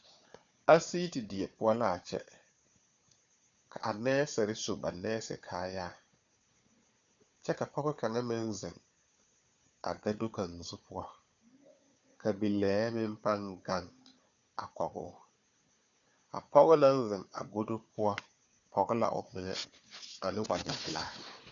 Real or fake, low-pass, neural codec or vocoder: fake; 7.2 kHz; codec, 16 kHz, 4 kbps, FunCodec, trained on Chinese and English, 50 frames a second